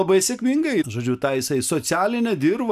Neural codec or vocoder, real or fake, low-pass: none; real; 14.4 kHz